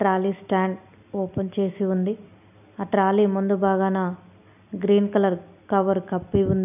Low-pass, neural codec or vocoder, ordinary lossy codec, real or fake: 3.6 kHz; none; none; real